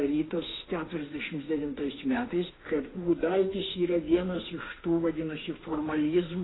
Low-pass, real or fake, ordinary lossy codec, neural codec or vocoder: 7.2 kHz; fake; AAC, 16 kbps; autoencoder, 48 kHz, 32 numbers a frame, DAC-VAE, trained on Japanese speech